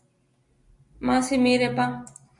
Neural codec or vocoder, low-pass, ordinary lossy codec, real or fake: none; 10.8 kHz; MP3, 64 kbps; real